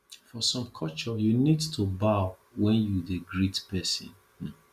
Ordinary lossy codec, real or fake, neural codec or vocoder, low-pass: Opus, 64 kbps; real; none; 14.4 kHz